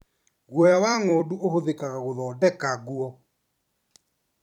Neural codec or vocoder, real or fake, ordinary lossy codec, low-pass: vocoder, 44.1 kHz, 128 mel bands every 256 samples, BigVGAN v2; fake; none; 19.8 kHz